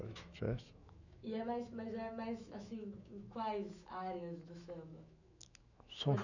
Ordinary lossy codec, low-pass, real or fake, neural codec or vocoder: none; 7.2 kHz; fake; autoencoder, 48 kHz, 128 numbers a frame, DAC-VAE, trained on Japanese speech